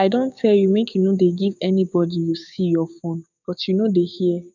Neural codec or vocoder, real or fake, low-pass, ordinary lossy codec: codec, 16 kHz, 6 kbps, DAC; fake; 7.2 kHz; none